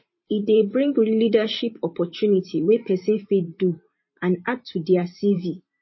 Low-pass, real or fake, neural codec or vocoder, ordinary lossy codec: 7.2 kHz; fake; vocoder, 44.1 kHz, 128 mel bands every 512 samples, BigVGAN v2; MP3, 24 kbps